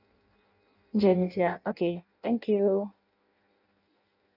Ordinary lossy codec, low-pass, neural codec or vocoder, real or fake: none; 5.4 kHz; codec, 16 kHz in and 24 kHz out, 0.6 kbps, FireRedTTS-2 codec; fake